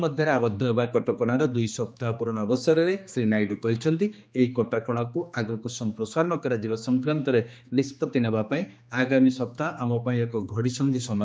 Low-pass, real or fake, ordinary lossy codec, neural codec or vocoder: none; fake; none; codec, 16 kHz, 2 kbps, X-Codec, HuBERT features, trained on general audio